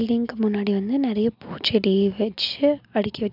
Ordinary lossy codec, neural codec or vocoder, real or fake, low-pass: none; none; real; 5.4 kHz